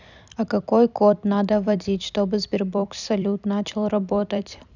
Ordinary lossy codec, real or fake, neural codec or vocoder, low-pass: none; fake; vocoder, 44.1 kHz, 128 mel bands every 256 samples, BigVGAN v2; 7.2 kHz